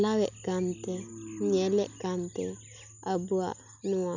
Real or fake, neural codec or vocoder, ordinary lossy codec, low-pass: real; none; none; 7.2 kHz